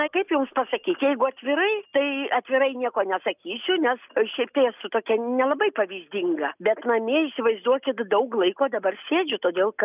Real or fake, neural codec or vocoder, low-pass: real; none; 3.6 kHz